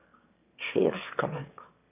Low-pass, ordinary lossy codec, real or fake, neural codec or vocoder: 3.6 kHz; AAC, 32 kbps; fake; autoencoder, 22.05 kHz, a latent of 192 numbers a frame, VITS, trained on one speaker